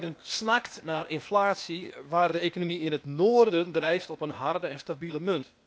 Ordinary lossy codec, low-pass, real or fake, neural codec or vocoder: none; none; fake; codec, 16 kHz, 0.8 kbps, ZipCodec